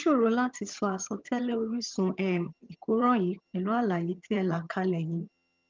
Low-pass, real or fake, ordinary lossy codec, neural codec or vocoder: 7.2 kHz; fake; Opus, 24 kbps; vocoder, 22.05 kHz, 80 mel bands, HiFi-GAN